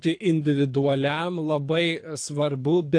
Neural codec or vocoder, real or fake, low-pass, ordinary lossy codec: codec, 24 kHz, 3 kbps, HILCodec; fake; 9.9 kHz; AAC, 48 kbps